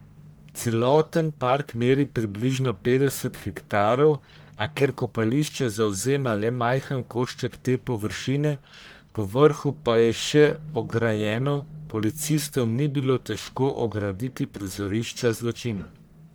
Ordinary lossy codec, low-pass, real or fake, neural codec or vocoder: none; none; fake; codec, 44.1 kHz, 1.7 kbps, Pupu-Codec